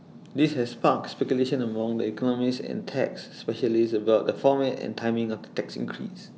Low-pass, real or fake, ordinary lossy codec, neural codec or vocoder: none; real; none; none